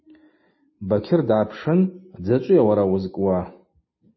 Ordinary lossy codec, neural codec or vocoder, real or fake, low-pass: MP3, 24 kbps; none; real; 7.2 kHz